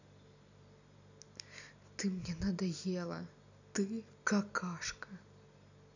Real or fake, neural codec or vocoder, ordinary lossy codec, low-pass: real; none; none; 7.2 kHz